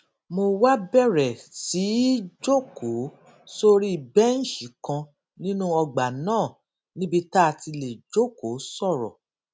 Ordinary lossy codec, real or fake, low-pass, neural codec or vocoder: none; real; none; none